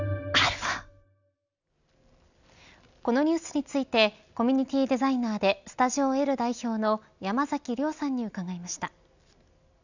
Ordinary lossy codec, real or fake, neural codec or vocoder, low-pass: none; real; none; 7.2 kHz